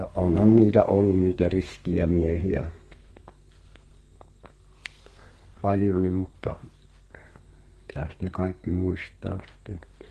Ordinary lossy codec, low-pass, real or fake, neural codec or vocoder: Opus, 24 kbps; 14.4 kHz; fake; codec, 32 kHz, 1.9 kbps, SNAC